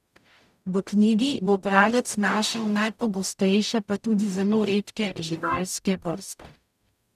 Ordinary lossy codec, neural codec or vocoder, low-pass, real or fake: none; codec, 44.1 kHz, 0.9 kbps, DAC; 14.4 kHz; fake